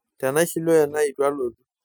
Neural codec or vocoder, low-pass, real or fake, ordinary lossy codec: none; none; real; none